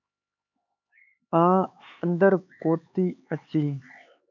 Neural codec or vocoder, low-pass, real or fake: codec, 16 kHz, 4 kbps, X-Codec, HuBERT features, trained on LibriSpeech; 7.2 kHz; fake